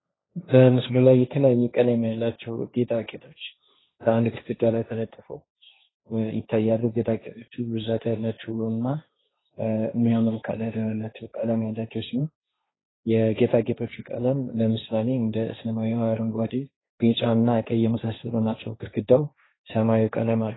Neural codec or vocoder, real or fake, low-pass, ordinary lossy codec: codec, 16 kHz, 1.1 kbps, Voila-Tokenizer; fake; 7.2 kHz; AAC, 16 kbps